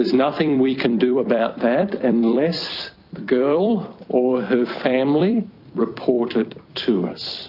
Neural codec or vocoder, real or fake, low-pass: vocoder, 44.1 kHz, 128 mel bands every 256 samples, BigVGAN v2; fake; 5.4 kHz